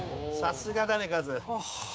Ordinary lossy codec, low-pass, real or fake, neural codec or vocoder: none; none; fake; codec, 16 kHz, 6 kbps, DAC